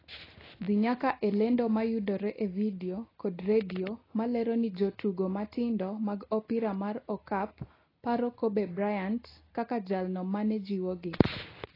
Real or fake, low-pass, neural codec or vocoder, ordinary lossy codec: real; 5.4 kHz; none; AAC, 24 kbps